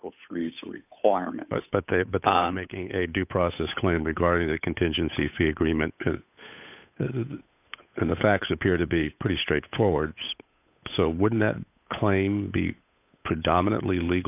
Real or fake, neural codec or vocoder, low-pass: fake; codec, 16 kHz, 8 kbps, FunCodec, trained on Chinese and English, 25 frames a second; 3.6 kHz